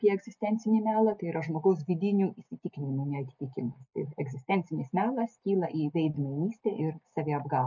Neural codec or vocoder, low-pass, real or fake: none; 7.2 kHz; real